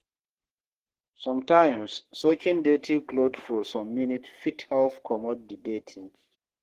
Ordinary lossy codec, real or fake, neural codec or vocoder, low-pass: Opus, 16 kbps; fake; codec, 44.1 kHz, 3.4 kbps, Pupu-Codec; 14.4 kHz